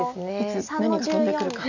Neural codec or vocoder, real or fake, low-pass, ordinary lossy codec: none; real; 7.2 kHz; none